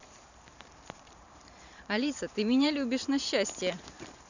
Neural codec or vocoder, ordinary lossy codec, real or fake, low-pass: vocoder, 44.1 kHz, 128 mel bands every 512 samples, BigVGAN v2; none; fake; 7.2 kHz